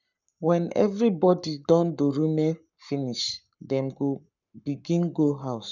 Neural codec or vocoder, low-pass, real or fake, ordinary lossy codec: codec, 44.1 kHz, 7.8 kbps, Pupu-Codec; 7.2 kHz; fake; none